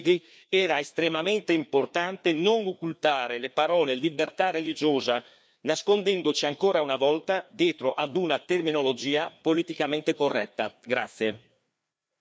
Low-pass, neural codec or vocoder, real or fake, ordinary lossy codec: none; codec, 16 kHz, 2 kbps, FreqCodec, larger model; fake; none